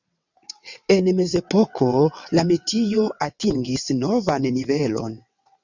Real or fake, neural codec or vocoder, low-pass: fake; vocoder, 22.05 kHz, 80 mel bands, WaveNeXt; 7.2 kHz